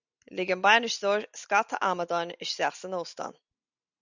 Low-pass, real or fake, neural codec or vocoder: 7.2 kHz; real; none